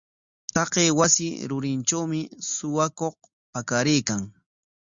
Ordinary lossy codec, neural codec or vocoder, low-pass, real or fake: Opus, 64 kbps; none; 7.2 kHz; real